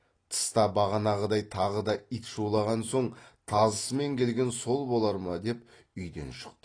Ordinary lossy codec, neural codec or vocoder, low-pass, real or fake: AAC, 32 kbps; none; 9.9 kHz; real